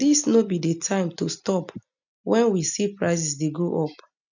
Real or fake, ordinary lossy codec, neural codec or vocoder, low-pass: real; none; none; 7.2 kHz